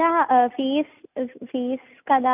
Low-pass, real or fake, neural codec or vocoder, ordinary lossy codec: 3.6 kHz; real; none; none